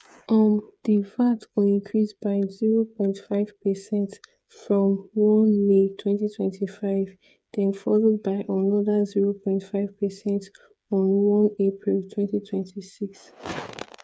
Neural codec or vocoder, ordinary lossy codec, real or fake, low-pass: codec, 16 kHz, 8 kbps, FreqCodec, smaller model; none; fake; none